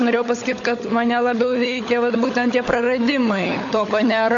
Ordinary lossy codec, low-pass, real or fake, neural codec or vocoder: AAC, 48 kbps; 7.2 kHz; fake; codec, 16 kHz, 16 kbps, FunCodec, trained on LibriTTS, 50 frames a second